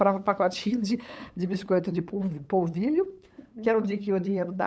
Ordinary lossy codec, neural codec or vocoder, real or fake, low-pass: none; codec, 16 kHz, 8 kbps, FunCodec, trained on LibriTTS, 25 frames a second; fake; none